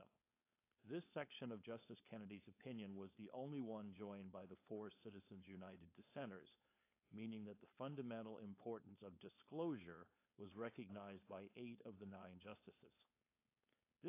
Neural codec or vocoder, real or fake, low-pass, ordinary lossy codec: codec, 16 kHz, 4.8 kbps, FACodec; fake; 3.6 kHz; AAC, 24 kbps